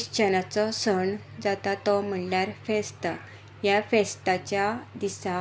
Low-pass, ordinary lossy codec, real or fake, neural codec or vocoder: none; none; real; none